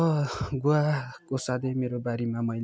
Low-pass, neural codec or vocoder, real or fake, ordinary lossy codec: none; none; real; none